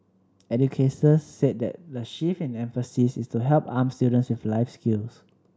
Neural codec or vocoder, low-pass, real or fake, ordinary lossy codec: none; none; real; none